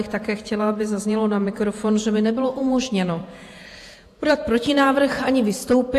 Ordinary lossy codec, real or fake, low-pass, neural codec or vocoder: AAC, 64 kbps; fake; 14.4 kHz; vocoder, 48 kHz, 128 mel bands, Vocos